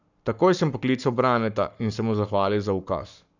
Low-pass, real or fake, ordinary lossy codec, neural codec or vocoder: 7.2 kHz; fake; none; codec, 44.1 kHz, 7.8 kbps, Pupu-Codec